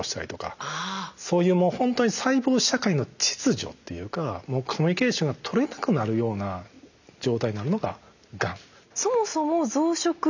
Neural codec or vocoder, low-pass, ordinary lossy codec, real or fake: none; 7.2 kHz; none; real